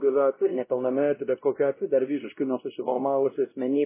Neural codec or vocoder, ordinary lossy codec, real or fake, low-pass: codec, 16 kHz, 0.5 kbps, X-Codec, WavLM features, trained on Multilingual LibriSpeech; MP3, 16 kbps; fake; 3.6 kHz